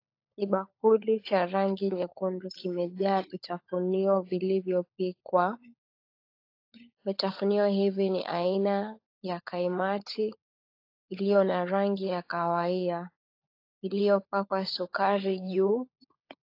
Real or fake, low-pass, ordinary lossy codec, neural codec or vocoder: fake; 5.4 kHz; AAC, 32 kbps; codec, 16 kHz, 16 kbps, FunCodec, trained on LibriTTS, 50 frames a second